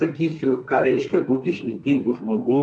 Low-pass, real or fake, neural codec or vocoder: 9.9 kHz; fake; codec, 24 kHz, 1 kbps, SNAC